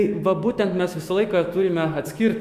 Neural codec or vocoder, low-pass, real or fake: autoencoder, 48 kHz, 128 numbers a frame, DAC-VAE, trained on Japanese speech; 14.4 kHz; fake